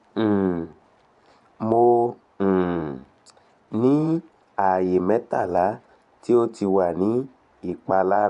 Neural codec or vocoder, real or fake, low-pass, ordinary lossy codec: vocoder, 24 kHz, 100 mel bands, Vocos; fake; 10.8 kHz; none